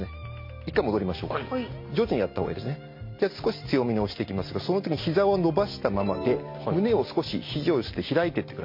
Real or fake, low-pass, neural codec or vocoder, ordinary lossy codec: real; 5.4 kHz; none; MP3, 32 kbps